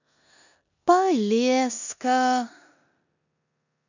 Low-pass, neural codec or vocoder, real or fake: 7.2 kHz; codec, 16 kHz in and 24 kHz out, 0.9 kbps, LongCat-Audio-Codec, four codebook decoder; fake